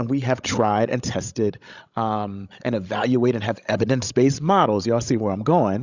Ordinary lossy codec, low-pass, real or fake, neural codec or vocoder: Opus, 64 kbps; 7.2 kHz; fake; codec, 16 kHz, 16 kbps, FreqCodec, larger model